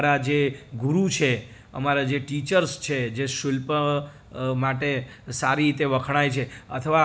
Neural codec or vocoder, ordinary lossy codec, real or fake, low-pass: none; none; real; none